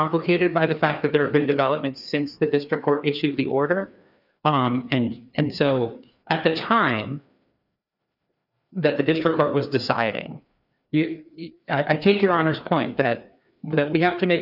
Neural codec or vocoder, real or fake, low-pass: codec, 16 kHz, 2 kbps, FreqCodec, larger model; fake; 5.4 kHz